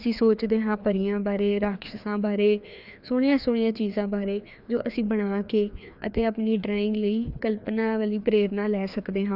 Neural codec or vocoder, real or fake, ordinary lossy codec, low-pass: codec, 16 kHz, 2 kbps, FreqCodec, larger model; fake; none; 5.4 kHz